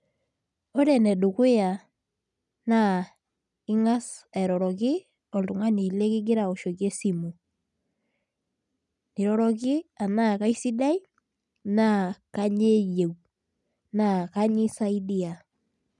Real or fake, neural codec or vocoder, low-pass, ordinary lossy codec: real; none; 10.8 kHz; none